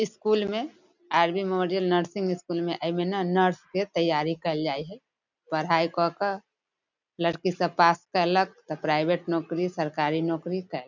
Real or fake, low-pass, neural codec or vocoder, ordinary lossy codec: real; 7.2 kHz; none; none